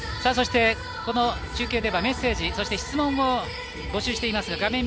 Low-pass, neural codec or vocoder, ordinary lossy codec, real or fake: none; none; none; real